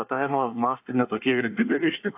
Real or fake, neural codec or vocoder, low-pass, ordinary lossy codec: fake; codec, 24 kHz, 1 kbps, SNAC; 3.6 kHz; AAC, 32 kbps